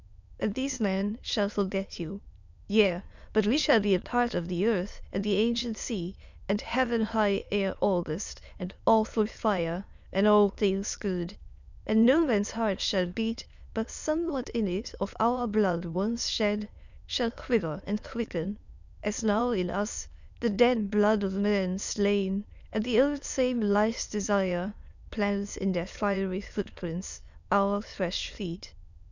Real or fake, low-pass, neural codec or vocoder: fake; 7.2 kHz; autoencoder, 22.05 kHz, a latent of 192 numbers a frame, VITS, trained on many speakers